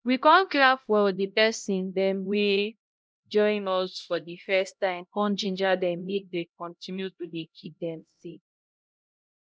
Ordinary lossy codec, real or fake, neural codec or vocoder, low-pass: none; fake; codec, 16 kHz, 0.5 kbps, X-Codec, HuBERT features, trained on LibriSpeech; none